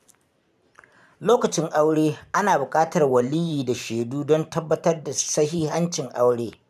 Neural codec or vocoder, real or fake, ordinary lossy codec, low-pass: vocoder, 44.1 kHz, 128 mel bands every 512 samples, BigVGAN v2; fake; none; 14.4 kHz